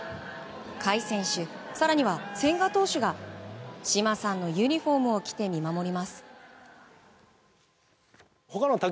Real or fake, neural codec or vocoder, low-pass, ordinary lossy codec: real; none; none; none